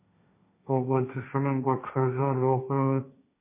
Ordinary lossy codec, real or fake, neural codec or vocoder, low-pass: MP3, 24 kbps; fake; codec, 16 kHz, 1.1 kbps, Voila-Tokenizer; 3.6 kHz